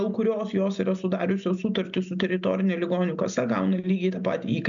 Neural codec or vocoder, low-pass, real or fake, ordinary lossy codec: none; 7.2 kHz; real; MP3, 64 kbps